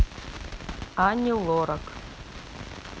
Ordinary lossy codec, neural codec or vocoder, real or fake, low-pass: none; none; real; none